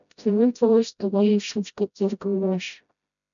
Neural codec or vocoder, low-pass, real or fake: codec, 16 kHz, 0.5 kbps, FreqCodec, smaller model; 7.2 kHz; fake